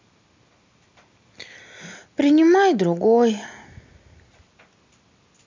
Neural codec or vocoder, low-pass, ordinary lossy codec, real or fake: none; 7.2 kHz; MP3, 64 kbps; real